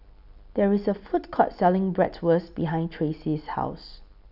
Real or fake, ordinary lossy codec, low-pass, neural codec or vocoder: real; none; 5.4 kHz; none